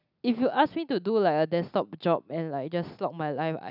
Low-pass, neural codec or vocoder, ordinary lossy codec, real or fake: 5.4 kHz; none; none; real